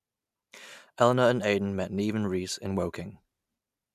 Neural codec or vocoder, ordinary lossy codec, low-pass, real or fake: none; none; 14.4 kHz; real